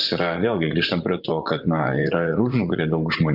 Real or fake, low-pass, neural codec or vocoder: fake; 5.4 kHz; vocoder, 24 kHz, 100 mel bands, Vocos